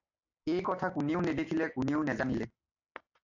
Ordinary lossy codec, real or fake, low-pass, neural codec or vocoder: AAC, 48 kbps; real; 7.2 kHz; none